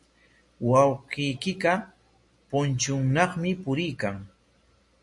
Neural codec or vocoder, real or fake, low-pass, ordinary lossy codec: none; real; 10.8 kHz; MP3, 48 kbps